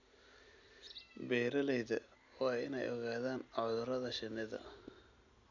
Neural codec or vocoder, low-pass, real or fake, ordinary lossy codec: none; 7.2 kHz; real; none